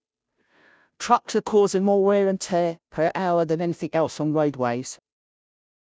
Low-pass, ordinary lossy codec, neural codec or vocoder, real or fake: none; none; codec, 16 kHz, 0.5 kbps, FunCodec, trained on Chinese and English, 25 frames a second; fake